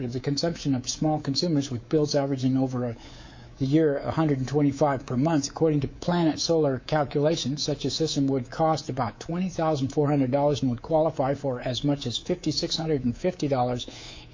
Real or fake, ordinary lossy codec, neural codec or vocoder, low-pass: fake; MP3, 48 kbps; codec, 24 kHz, 3.1 kbps, DualCodec; 7.2 kHz